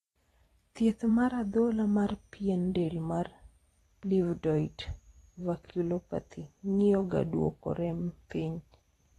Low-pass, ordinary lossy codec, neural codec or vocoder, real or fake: 19.8 kHz; AAC, 32 kbps; vocoder, 44.1 kHz, 128 mel bands every 512 samples, BigVGAN v2; fake